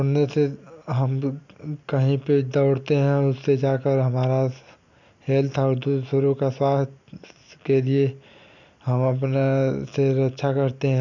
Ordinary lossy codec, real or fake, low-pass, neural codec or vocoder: none; real; 7.2 kHz; none